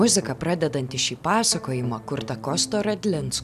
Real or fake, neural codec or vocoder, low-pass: real; none; 14.4 kHz